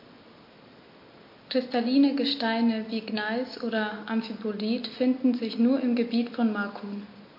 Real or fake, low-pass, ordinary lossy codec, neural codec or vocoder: real; 5.4 kHz; MP3, 32 kbps; none